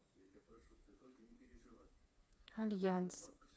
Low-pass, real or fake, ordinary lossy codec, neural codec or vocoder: none; fake; none; codec, 16 kHz, 4 kbps, FreqCodec, smaller model